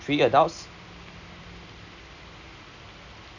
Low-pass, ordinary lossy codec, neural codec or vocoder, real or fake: 7.2 kHz; none; none; real